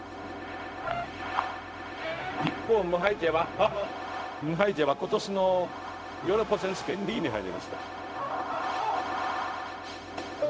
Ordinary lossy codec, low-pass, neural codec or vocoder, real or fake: none; none; codec, 16 kHz, 0.4 kbps, LongCat-Audio-Codec; fake